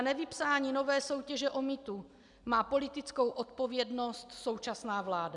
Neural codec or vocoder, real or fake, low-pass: none; real; 10.8 kHz